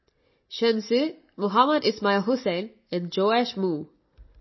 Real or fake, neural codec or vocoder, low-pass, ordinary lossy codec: real; none; 7.2 kHz; MP3, 24 kbps